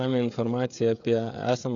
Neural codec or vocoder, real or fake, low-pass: codec, 16 kHz, 16 kbps, FreqCodec, smaller model; fake; 7.2 kHz